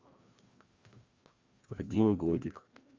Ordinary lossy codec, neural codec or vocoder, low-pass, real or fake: Opus, 32 kbps; codec, 16 kHz, 1 kbps, FreqCodec, larger model; 7.2 kHz; fake